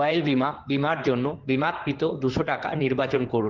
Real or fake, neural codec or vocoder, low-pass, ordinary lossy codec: fake; codec, 16 kHz in and 24 kHz out, 2.2 kbps, FireRedTTS-2 codec; 7.2 kHz; Opus, 16 kbps